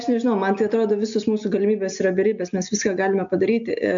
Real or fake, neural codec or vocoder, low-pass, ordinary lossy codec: real; none; 7.2 kHz; AAC, 64 kbps